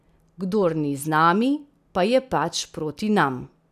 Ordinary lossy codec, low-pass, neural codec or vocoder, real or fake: none; 14.4 kHz; none; real